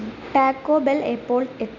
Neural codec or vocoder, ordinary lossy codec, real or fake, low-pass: none; none; real; 7.2 kHz